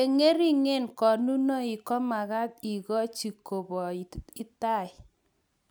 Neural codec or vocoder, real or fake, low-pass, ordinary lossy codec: none; real; none; none